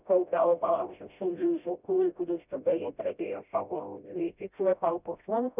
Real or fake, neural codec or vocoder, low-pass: fake; codec, 16 kHz, 0.5 kbps, FreqCodec, smaller model; 3.6 kHz